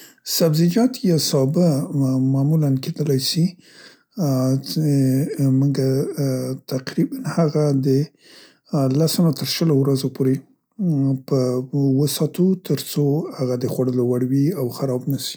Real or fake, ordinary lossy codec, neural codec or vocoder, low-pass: real; none; none; none